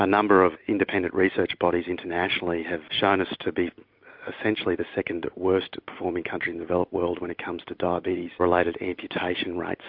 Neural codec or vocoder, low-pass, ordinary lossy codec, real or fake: none; 5.4 kHz; MP3, 48 kbps; real